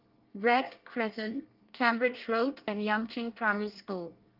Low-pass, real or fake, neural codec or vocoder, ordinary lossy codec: 5.4 kHz; fake; codec, 24 kHz, 1 kbps, SNAC; Opus, 32 kbps